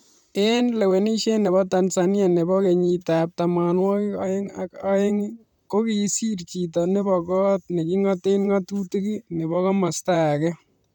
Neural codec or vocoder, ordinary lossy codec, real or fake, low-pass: vocoder, 44.1 kHz, 128 mel bands, Pupu-Vocoder; none; fake; 19.8 kHz